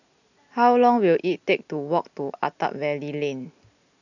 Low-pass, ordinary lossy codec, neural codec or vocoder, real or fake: 7.2 kHz; none; none; real